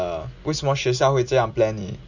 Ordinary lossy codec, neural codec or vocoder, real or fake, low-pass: none; none; real; 7.2 kHz